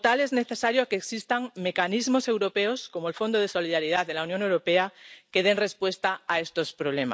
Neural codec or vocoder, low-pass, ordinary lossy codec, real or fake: none; none; none; real